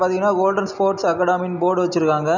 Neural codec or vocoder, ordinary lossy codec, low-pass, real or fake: none; none; 7.2 kHz; real